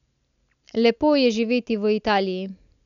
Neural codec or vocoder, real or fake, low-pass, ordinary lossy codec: none; real; 7.2 kHz; none